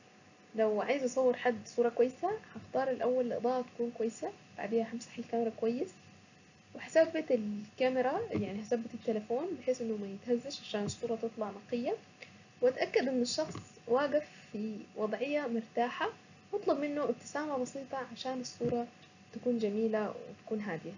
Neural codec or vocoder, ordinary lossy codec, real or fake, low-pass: none; none; real; 7.2 kHz